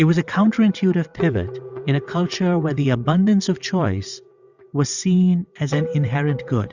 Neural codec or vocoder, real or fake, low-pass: vocoder, 22.05 kHz, 80 mel bands, WaveNeXt; fake; 7.2 kHz